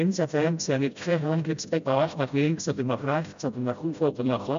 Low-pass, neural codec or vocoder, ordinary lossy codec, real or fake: 7.2 kHz; codec, 16 kHz, 0.5 kbps, FreqCodec, smaller model; MP3, 64 kbps; fake